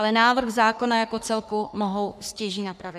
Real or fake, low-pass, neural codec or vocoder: fake; 14.4 kHz; codec, 44.1 kHz, 3.4 kbps, Pupu-Codec